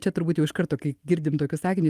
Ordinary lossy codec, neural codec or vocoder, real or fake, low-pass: Opus, 32 kbps; none; real; 14.4 kHz